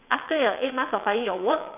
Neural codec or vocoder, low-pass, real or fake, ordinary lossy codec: vocoder, 22.05 kHz, 80 mel bands, WaveNeXt; 3.6 kHz; fake; none